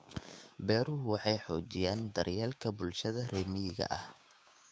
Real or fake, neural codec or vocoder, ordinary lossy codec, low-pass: fake; codec, 16 kHz, 6 kbps, DAC; none; none